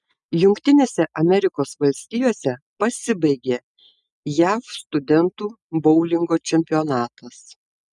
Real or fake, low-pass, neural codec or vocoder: real; 10.8 kHz; none